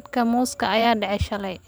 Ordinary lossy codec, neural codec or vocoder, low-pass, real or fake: none; vocoder, 44.1 kHz, 128 mel bands every 512 samples, BigVGAN v2; none; fake